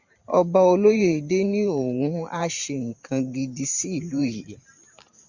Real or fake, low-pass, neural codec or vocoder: fake; 7.2 kHz; vocoder, 44.1 kHz, 128 mel bands every 512 samples, BigVGAN v2